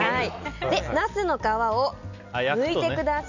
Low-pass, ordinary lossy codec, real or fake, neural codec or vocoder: 7.2 kHz; none; real; none